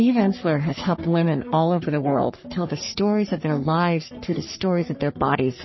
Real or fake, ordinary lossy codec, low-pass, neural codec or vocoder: fake; MP3, 24 kbps; 7.2 kHz; codec, 44.1 kHz, 3.4 kbps, Pupu-Codec